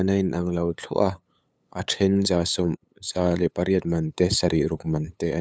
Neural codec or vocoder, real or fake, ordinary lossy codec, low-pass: codec, 16 kHz, 8 kbps, FunCodec, trained on LibriTTS, 25 frames a second; fake; none; none